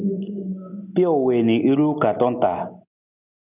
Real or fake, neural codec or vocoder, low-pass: real; none; 3.6 kHz